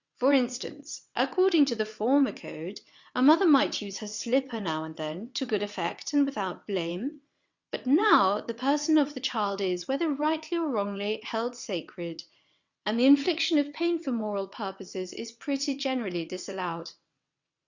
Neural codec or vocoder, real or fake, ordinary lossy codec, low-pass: vocoder, 22.05 kHz, 80 mel bands, WaveNeXt; fake; Opus, 64 kbps; 7.2 kHz